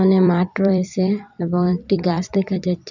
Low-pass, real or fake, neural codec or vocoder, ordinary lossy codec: 7.2 kHz; fake; vocoder, 44.1 kHz, 128 mel bands every 256 samples, BigVGAN v2; none